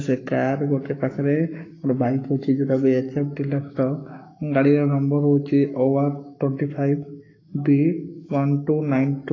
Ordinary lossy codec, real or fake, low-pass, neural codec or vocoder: AAC, 32 kbps; fake; 7.2 kHz; codec, 44.1 kHz, 7.8 kbps, Pupu-Codec